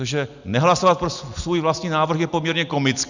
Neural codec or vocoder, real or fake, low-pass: none; real; 7.2 kHz